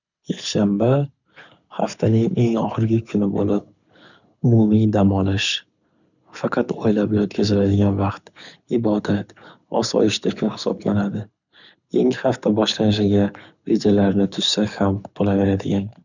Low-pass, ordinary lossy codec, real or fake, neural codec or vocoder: 7.2 kHz; none; fake; codec, 24 kHz, 6 kbps, HILCodec